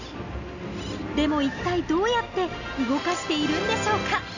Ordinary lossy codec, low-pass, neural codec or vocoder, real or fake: none; 7.2 kHz; none; real